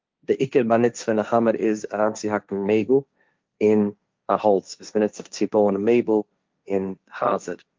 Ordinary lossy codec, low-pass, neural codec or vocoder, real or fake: Opus, 24 kbps; 7.2 kHz; codec, 16 kHz, 1.1 kbps, Voila-Tokenizer; fake